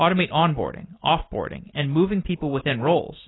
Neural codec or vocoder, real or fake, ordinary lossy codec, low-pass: none; real; AAC, 16 kbps; 7.2 kHz